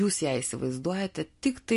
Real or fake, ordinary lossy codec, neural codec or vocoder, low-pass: real; MP3, 48 kbps; none; 14.4 kHz